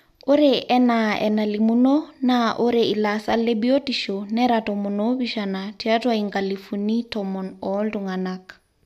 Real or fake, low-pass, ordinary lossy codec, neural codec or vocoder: real; 14.4 kHz; none; none